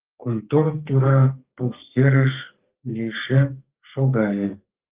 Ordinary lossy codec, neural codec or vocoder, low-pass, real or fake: Opus, 32 kbps; codec, 32 kHz, 1.9 kbps, SNAC; 3.6 kHz; fake